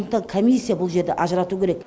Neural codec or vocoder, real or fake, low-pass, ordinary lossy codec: none; real; none; none